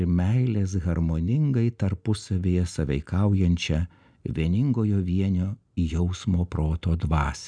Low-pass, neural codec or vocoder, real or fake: 9.9 kHz; none; real